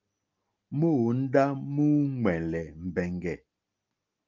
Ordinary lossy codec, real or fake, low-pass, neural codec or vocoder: Opus, 24 kbps; real; 7.2 kHz; none